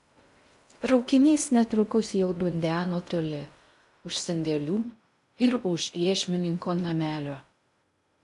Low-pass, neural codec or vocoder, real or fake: 10.8 kHz; codec, 16 kHz in and 24 kHz out, 0.6 kbps, FocalCodec, streaming, 2048 codes; fake